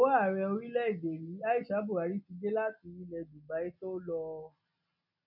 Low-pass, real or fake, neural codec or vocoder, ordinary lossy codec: 5.4 kHz; real; none; none